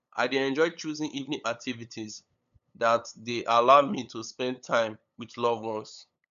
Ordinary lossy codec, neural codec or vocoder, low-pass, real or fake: none; codec, 16 kHz, 8 kbps, FunCodec, trained on LibriTTS, 25 frames a second; 7.2 kHz; fake